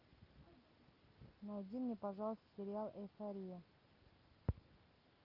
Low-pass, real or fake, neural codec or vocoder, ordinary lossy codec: 5.4 kHz; real; none; Opus, 24 kbps